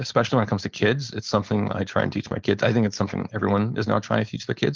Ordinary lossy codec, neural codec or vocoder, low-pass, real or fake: Opus, 32 kbps; codec, 16 kHz, 4.8 kbps, FACodec; 7.2 kHz; fake